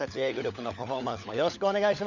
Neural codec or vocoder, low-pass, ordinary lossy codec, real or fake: codec, 16 kHz, 16 kbps, FunCodec, trained on LibriTTS, 50 frames a second; 7.2 kHz; none; fake